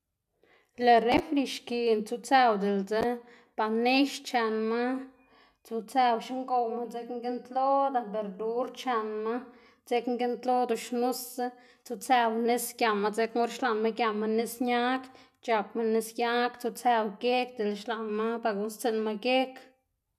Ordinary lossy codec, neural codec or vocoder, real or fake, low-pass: none; none; real; 14.4 kHz